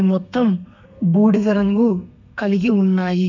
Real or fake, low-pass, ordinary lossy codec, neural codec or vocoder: fake; 7.2 kHz; none; codec, 32 kHz, 1.9 kbps, SNAC